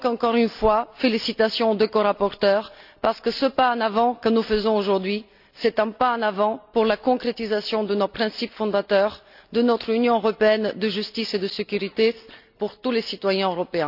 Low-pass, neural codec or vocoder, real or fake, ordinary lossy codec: 5.4 kHz; none; real; none